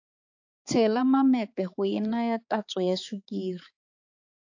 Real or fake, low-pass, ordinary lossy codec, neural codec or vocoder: fake; 7.2 kHz; AAC, 48 kbps; codec, 16 kHz, 4 kbps, X-Codec, HuBERT features, trained on balanced general audio